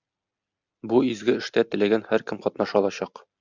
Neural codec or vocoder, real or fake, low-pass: none; real; 7.2 kHz